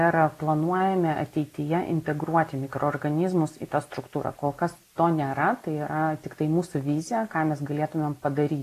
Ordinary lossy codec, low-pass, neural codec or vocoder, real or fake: AAC, 48 kbps; 14.4 kHz; none; real